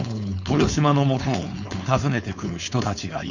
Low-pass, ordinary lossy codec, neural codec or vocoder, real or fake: 7.2 kHz; none; codec, 16 kHz, 4.8 kbps, FACodec; fake